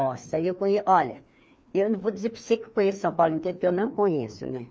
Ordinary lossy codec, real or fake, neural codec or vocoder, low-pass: none; fake; codec, 16 kHz, 2 kbps, FreqCodec, larger model; none